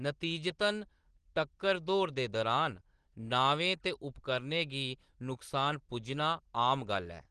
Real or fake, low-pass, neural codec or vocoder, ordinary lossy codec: real; 9.9 kHz; none; Opus, 16 kbps